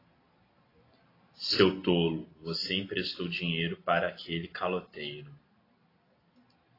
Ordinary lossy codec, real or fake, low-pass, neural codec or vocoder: AAC, 24 kbps; real; 5.4 kHz; none